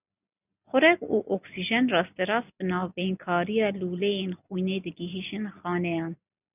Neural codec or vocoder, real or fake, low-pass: none; real; 3.6 kHz